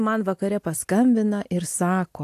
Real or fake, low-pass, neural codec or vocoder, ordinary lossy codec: real; 14.4 kHz; none; AAC, 64 kbps